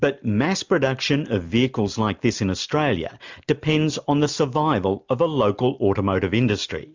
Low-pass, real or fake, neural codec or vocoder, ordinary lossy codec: 7.2 kHz; real; none; MP3, 64 kbps